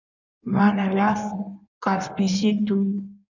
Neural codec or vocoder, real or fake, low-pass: codec, 16 kHz in and 24 kHz out, 1.1 kbps, FireRedTTS-2 codec; fake; 7.2 kHz